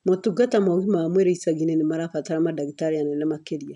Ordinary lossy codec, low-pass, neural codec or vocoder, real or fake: none; 10.8 kHz; none; real